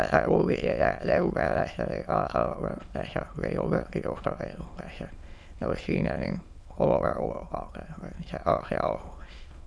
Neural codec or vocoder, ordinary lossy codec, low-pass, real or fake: autoencoder, 22.05 kHz, a latent of 192 numbers a frame, VITS, trained on many speakers; none; none; fake